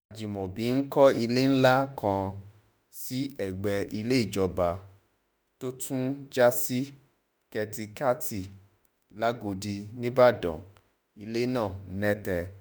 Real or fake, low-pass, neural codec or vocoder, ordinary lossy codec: fake; none; autoencoder, 48 kHz, 32 numbers a frame, DAC-VAE, trained on Japanese speech; none